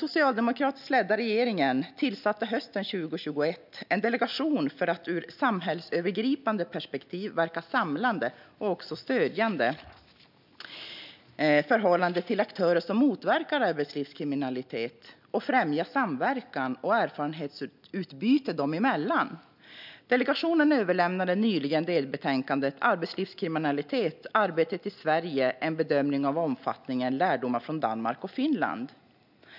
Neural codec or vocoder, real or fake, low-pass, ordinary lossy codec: none; real; 5.4 kHz; none